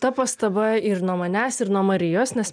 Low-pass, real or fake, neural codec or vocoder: 9.9 kHz; real; none